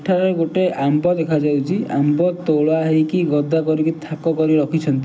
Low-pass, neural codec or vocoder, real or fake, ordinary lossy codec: none; none; real; none